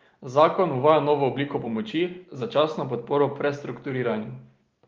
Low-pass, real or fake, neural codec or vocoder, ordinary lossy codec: 7.2 kHz; real; none; Opus, 32 kbps